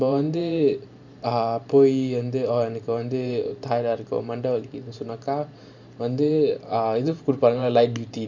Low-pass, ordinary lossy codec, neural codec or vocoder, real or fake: 7.2 kHz; none; vocoder, 44.1 kHz, 128 mel bands every 512 samples, BigVGAN v2; fake